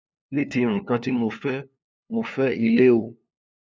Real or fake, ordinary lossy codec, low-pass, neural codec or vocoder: fake; none; none; codec, 16 kHz, 2 kbps, FunCodec, trained on LibriTTS, 25 frames a second